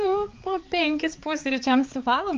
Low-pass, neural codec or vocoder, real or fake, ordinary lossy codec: 7.2 kHz; codec, 16 kHz, 4 kbps, X-Codec, HuBERT features, trained on balanced general audio; fake; Opus, 24 kbps